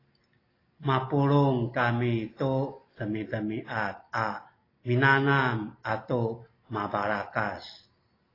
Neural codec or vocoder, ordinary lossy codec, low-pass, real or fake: none; AAC, 24 kbps; 5.4 kHz; real